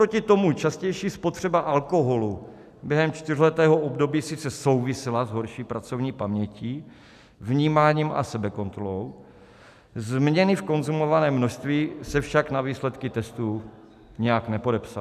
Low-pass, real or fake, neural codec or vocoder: 14.4 kHz; real; none